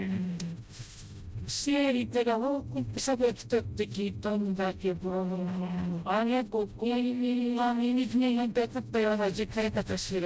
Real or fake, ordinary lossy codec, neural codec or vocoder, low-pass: fake; none; codec, 16 kHz, 0.5 kbps, FreqCodec, smaller model; none